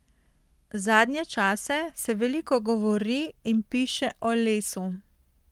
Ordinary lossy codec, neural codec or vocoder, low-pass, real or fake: Opus, 32 kbps; codec, 44.1 kHz, 7.8 kbps, Pupu-Codec; 19.8 kHz; fake